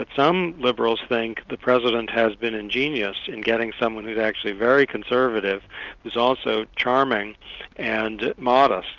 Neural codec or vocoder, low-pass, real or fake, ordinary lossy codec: none; 7.2 kHz; real; Opus, 32 kbps